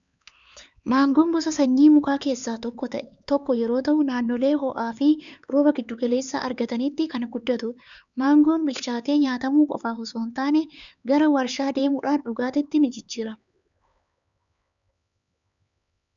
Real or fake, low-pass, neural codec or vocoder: fake; 7.2 kHz; codec, 16 kHz, 4 kbps, X-Codec, HuBERT features, trained on LibriSpeech